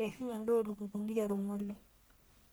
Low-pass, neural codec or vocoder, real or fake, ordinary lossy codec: none; codec, 44.1 kHz, 1.7 kbps, Pupu-Codec; fake; none